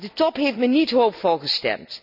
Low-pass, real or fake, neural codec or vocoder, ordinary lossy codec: 5.4 kHz; real; none; none